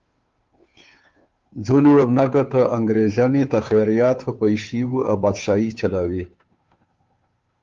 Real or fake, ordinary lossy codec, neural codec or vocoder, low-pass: fake; Opus, 32 kbps; codec, 16 kHz, 2 kbps, FunCodec, trained on Chinese and English, 25 frames a second; 7.2 kHz